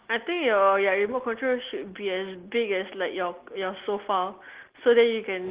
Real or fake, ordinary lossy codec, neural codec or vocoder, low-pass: real; Opus, 16 kbps; none; 3.6 kHz